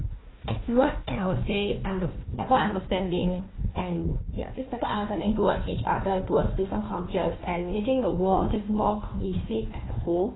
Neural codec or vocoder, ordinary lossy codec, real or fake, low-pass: codec, 16 kHz, 1 kbps, FunCodec, trained on Chinese and English, 50 frames a second; AAC, 16 kbps; fake; 7.2 kHz